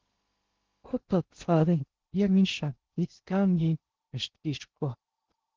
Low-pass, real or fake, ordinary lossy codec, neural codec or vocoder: 7.2 kHz; fake; Opus, 16 kbps; codec, 16 kHz in and 24 kHz out, 0.6 kbps, FocalCodec, streaming, 2048 codes